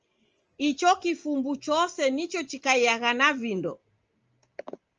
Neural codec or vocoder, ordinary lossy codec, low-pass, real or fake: none; Opus, 24 kbps; 7.2 kHz; real